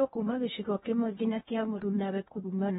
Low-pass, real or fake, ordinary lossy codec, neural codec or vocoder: 10.8 kHz; fake; AAC, 16 kbps; codec, 16 kHz in and 24 kHz out, 0.8 kbps, FocalCodec, streaming, 65536 codes